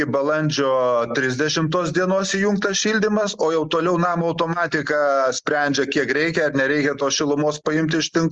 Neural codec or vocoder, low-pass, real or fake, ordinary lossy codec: none; 9.9 kHz; real; Opus, 32 kbps